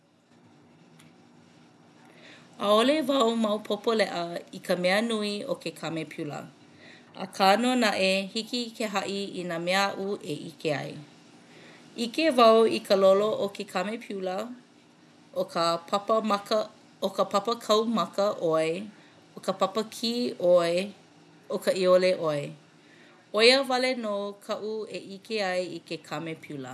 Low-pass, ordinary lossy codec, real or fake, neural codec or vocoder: none; none; real; none